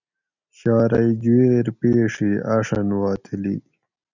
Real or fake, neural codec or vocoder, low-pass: real; none; 7.2 kHz